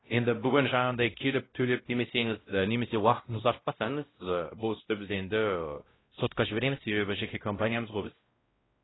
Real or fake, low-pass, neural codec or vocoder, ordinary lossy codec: fake; 7.2 kHz; codec, 16 kHz, 0.5 kbps, X-Codec, WavLM features, trained on Multilingual LibriSpeech; AAC, 16 kbps